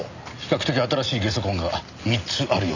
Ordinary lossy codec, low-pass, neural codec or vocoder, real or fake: none; 7.2 kHz; none; real